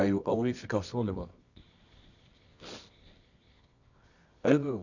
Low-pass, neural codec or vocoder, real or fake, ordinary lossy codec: 7.2 kHz; codec, 24 kHz, 0.9 kbps, WavTokenizer, medium music audio release; fake; none